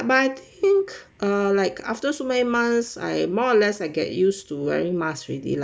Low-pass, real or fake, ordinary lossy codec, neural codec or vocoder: none; real; none; none